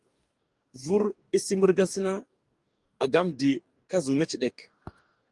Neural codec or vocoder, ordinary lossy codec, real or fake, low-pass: codec, 44.1 kHz, 2.6 kbps, DAC; Opus, 32 kbps; fake; 10.8 kHz